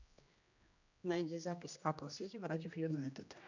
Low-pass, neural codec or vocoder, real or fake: 7.2 kHz; codec, 16 kHz, 1 kbps, X-Codec, HuBERT features, trained on general audio; fake